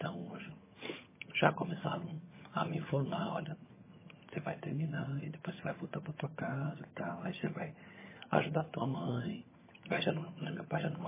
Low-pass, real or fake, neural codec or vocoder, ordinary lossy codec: 3.6 kHz; fake; vocoder, 22.05 kHz, 80 mel bands, HiFi-GAN; MP3, 16 kbps